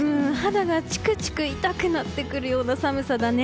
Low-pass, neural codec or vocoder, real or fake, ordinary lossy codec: none; none; real; none